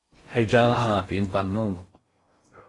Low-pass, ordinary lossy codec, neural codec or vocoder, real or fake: 10.8 kHz; AAC, 32 kbps; codec, 16 kHz in and 24 kHz out, 0.6 kbps, FocalCodec, streaming, 2048 codes; fake